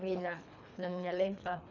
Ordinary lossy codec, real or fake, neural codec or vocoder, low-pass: none; fake; codec, 24 kHz, 3 kbps, HILCodec; 7.2 kHz